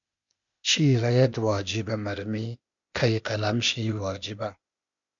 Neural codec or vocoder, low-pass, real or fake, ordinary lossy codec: codec, 16 kHz, 0.8 kbps, ZipCodec; 7.2 kHz; fake; MP3, 64 kbps